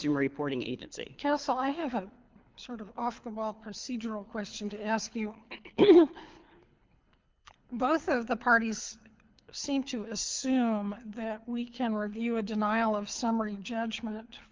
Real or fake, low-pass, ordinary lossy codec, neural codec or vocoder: fake; 7.2 kHz; Opus, 24 kbps; codec, 24 kHz, 6 kbps, HILCodec